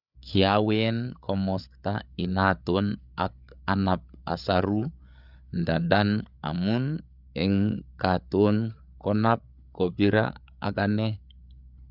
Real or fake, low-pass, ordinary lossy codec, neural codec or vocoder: fake; 5.4 kHz; none; codec, 16 kHz, 8 kbps, FreqCodec, larger model